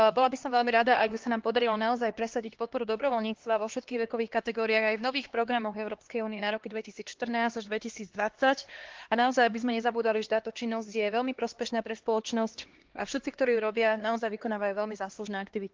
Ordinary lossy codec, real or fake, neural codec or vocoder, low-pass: Opus, 16 kbps; fake; codec, 16 kHz, 2 kbps, X-Codec, HuBERT features, trained on LibriSpeech; 7.2 kHz